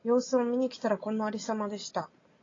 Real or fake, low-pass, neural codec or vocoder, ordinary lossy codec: real; 7.2 kHz; none; AAC, 32 kbps